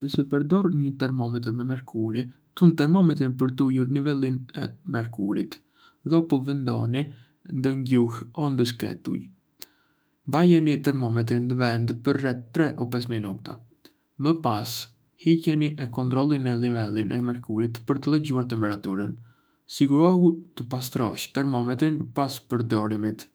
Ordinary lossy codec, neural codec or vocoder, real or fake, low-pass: none; autoencoder, 48 kHz, 32 numbers a frame, DAC-VAE, trained on Japanese speech; fake; none